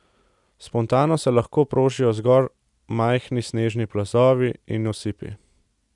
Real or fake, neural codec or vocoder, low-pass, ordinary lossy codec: real; none; 10.8 kHz; none